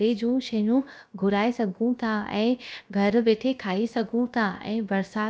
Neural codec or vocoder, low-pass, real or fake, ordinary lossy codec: codec, 16 kHz, about 1 kbps, DyCAST, with the encoder's durations; none; fake; none